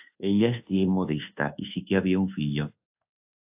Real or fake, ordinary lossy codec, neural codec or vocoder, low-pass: fake; AAC, 32 kbps; codec, 24 kHz, 1.2 kbps, DualCodec; 3.6 kHz